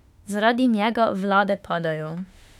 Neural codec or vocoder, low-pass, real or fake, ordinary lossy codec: autoencoder, 48 kHz, 32 numbers a frame, DAC-VAE, trained on Japanese speech; 19.8 kHz; fake; none